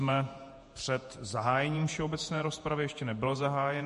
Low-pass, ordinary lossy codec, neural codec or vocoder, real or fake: 14.4 kHz; MP3, 48 kbps; vocoder, 48 kHz, 128 mel bands, Vocos; fake